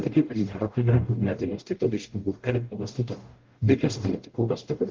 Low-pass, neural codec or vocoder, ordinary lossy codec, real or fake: 7.2 kHz; codec, 44.1 kHz, 0.9 kbps, DAC; Opus, 16 kbps; fake